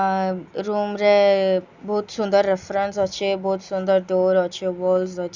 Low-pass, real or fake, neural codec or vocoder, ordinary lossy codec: 7.2 kHz; real; none; Opus, 64 kbps